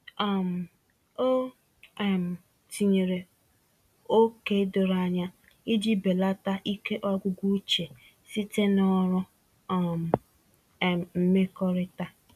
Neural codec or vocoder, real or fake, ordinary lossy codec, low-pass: none; real; none; 14.4 kHz